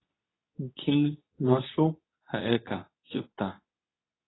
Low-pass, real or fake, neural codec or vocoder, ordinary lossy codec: 7.2 kHz; fake; codec, 24 kHz, 0.9 kbps, WavTokenizer, medium speech release version 2; AAC, 16 kbps